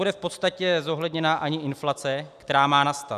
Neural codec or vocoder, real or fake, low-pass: none; real; 14.4 kHz